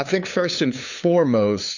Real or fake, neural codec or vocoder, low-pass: fake; codec, 16 kHz, 2 kbps, FunCodec, trained on LibriTTS, 25 frames a second; 7.2 kHz